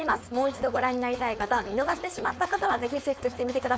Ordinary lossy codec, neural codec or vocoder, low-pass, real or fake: none; codec, 16 kHz, 4.8 kbps, FACodec; none; fake